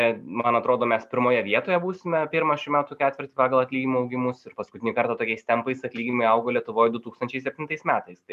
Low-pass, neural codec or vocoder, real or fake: 14.4 kHz; none; real